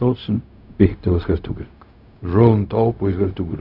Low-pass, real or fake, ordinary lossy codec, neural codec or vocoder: 5.4 kHz; fake; none; codec, 16 kHz, 0.4 kbps, LongCat-Audio-Codec